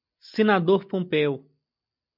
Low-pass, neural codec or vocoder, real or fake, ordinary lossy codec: 5.4 kHz; none; real; MP3, 48 kbps